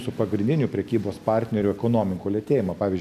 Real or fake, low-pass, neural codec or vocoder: real; 14.4 kHz; none